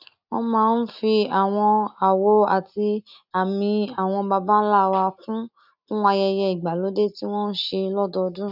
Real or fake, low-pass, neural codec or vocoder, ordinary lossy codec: real; 5.4 kHz; none; none